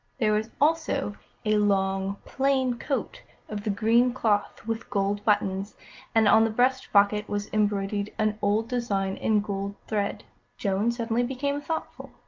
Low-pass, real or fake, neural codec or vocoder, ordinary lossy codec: 7.2 kHz; real; none; Opus, 32 kbps